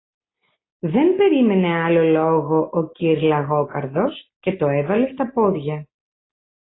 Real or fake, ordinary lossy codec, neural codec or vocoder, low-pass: real; AAC, 16 kbps; none; 7.2 kHz